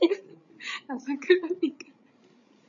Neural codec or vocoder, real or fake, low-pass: codec, 16 kHz, 16 kbps, FreqCodec, larger model; fake; 7.2 kHz